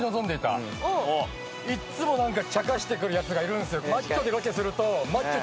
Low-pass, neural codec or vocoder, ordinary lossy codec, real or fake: none; none; none; real